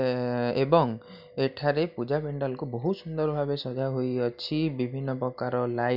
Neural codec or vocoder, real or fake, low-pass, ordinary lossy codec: none; real; 5.4 kHz; none